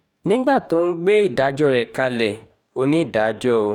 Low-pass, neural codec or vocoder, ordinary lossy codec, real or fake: 19.8 kHz; codec, 44.1 kHz, 2.6 kbps, DAC; none; fake